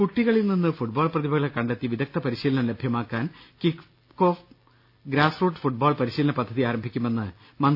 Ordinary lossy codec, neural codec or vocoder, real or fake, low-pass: none; none; real; 5.4 kHz